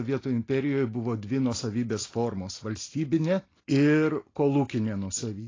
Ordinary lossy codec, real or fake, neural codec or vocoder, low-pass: AAC, 32 kbps; real; none; 7.2 kHz